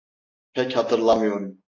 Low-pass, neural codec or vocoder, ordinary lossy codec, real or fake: 7.2 kHz; none; AAC, 32 kbps; real